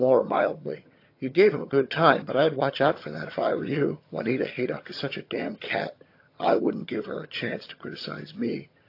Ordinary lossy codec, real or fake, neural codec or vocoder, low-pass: AAC, 32 kbps; fake; vocoder, 22.05 kHz, 80 mel bands, HiFi-GAN; 5.4 kHz